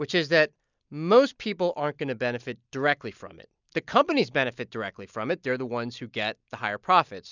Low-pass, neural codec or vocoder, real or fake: 7.2 kHz; none; real